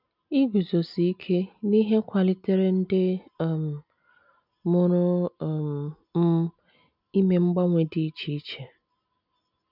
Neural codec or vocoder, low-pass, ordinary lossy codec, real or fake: none; 5.4 kHz; none; real